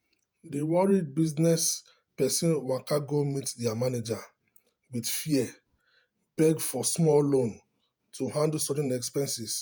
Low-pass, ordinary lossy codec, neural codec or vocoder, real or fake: none; none; vocoder, 48 kHz, 128 mel bands, Vocos; fake